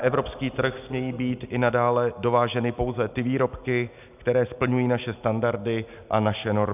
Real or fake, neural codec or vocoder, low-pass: real; none; 3.6 kHz